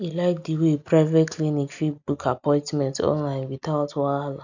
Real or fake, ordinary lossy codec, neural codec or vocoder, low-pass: real; none; none; 7.2 kHz